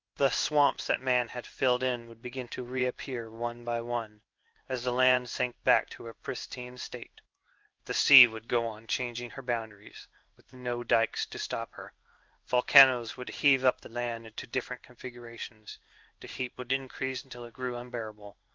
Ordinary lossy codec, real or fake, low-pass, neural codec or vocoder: Opus, 24 kbps; fake; 7.2 kHz; codec, 16 kHz in and 24 kHz out, 1 kbps, XY-Tokenizer